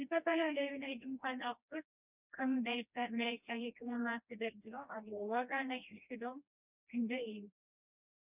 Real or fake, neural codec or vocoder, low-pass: fake; codec, 16 kHz, 1 kbps, FreqCodec, smaller model; 3.6 kHz